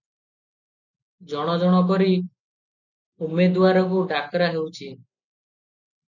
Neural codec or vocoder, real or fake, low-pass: none; real; 7.2 kHz